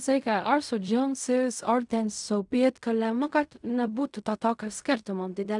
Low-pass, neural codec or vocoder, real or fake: 10.8 kHz; codec, 16 kHz in and 24 kHz out, 0.4 kbps, LongCat-Audio-Codec, fine tuned four codebook decoder; fake